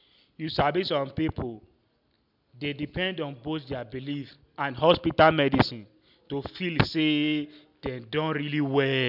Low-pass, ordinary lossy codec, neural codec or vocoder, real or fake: 5.4 kHz; none; none; real